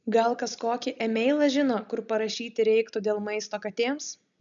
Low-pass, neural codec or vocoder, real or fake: 7.2 kHz; none; real